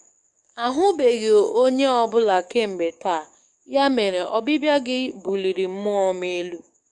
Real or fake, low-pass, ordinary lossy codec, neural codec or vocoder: fake; 10.8 kHz; Opus, 64 kbps; codec, 44.1 kHz, 7.8 kbps, DAC